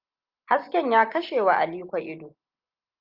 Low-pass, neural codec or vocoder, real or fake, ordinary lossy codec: 5.4 kHz; none; real; Opus, 24 kbps